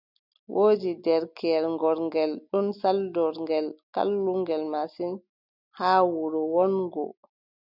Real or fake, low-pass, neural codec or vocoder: real; 5.4 kHz; none